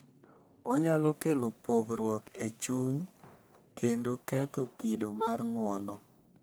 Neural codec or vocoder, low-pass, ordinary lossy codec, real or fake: codec, 44.1 kHz, 1.7 kbps, Pupu-Codec; none; none; fake